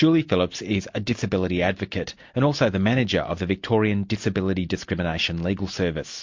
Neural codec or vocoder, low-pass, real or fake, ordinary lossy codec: none; 7.2 kHz; real; MP3, 48 kbps